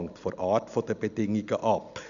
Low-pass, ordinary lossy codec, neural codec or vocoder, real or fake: 7.2 kHz; none; none; real